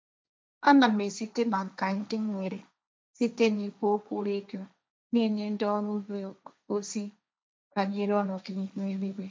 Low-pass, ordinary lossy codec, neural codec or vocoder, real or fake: none; none; codec, 16 kHz, 1.1 kbps, Voila-Tokenizer; fake